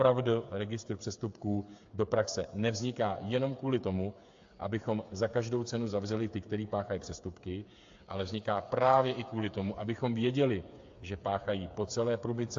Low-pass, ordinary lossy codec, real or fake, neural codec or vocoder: 7.2 kHz; AAC, 48 kbps; fake; codec, 16 kHz, 8 kbps, FreqCodec, smaller model